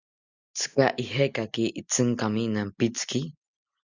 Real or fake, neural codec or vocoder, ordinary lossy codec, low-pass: real; none; Opus, 64 kbps; 7.2 kHz